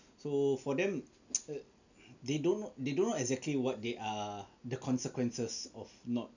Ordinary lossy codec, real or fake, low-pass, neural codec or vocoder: none; real; 7.2 kHz; none